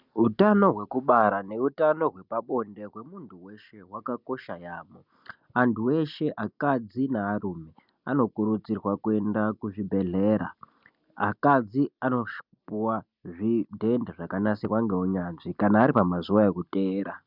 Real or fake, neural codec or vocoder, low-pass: real; none; 5.4 kHz